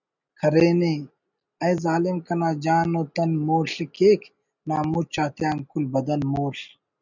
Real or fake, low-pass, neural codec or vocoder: real; 7.2 kHz; none